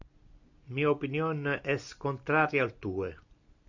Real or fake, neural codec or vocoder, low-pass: real; none; 7.2 kHz